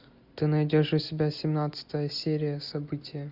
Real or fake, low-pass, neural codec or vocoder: real; 5.4 kHz; none